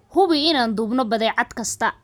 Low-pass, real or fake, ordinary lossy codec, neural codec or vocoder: none; real; none; none